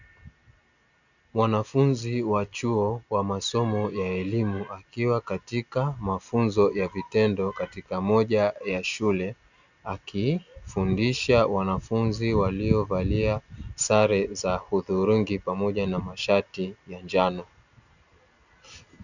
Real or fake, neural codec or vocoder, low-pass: real; none; 7.2 kHz